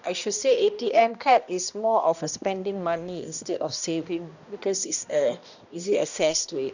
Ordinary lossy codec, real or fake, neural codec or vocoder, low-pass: none; fake; codec, 16 kHz, 1 kbps, X-Codec, HuBERT features, trained on balanced general audio; 7.2 kHz